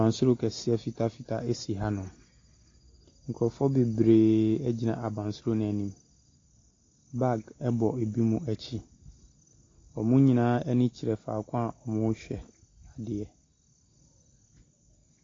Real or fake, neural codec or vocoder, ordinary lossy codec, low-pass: real; none; AAC, 32 kbps; 7.2 kHz